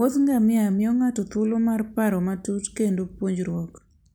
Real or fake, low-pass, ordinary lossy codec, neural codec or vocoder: real; none; none; none